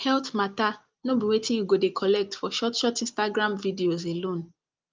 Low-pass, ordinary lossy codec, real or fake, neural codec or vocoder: 7.2 kHz; Opus, 24 kbps; real; none